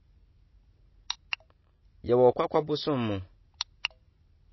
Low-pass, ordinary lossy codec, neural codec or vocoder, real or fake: 7.2 kHz; MP3, 24 kbps; none; real